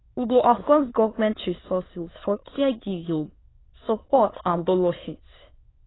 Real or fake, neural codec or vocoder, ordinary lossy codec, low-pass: fake; autoencoder, 22.05 kHz, a latent of 192 numbers a frame, VITS, trained on many speakers; AAC, 16 kbps; 7.2 kHz